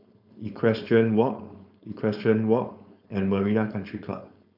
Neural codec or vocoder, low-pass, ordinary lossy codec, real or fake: codec, 16 kHz, 4.8 kbps, FACodec; 5.4 kHz; none; fake